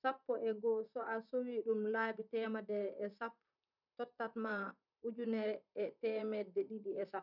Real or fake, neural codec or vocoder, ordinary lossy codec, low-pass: fake; vocoder, 44.1 kHz, 128 mel bands, Pupu-Vocoder; none; 5.4 kHz